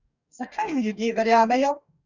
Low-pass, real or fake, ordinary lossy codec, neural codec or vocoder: 7.2 kHz; fake; none; codec, 44.1 kHz, 2.6 kbps, DAC